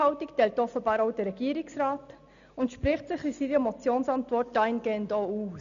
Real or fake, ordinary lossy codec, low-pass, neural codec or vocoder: real; none; 7.2 kHz; none